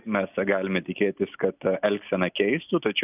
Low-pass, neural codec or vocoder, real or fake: 3.6 kHz; none; real